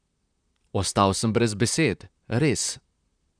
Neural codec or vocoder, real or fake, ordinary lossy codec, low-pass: vocoder, 44.1 kHz, 128 mel bands, Pupu-Vocoder; fake; none; 9.9 kHz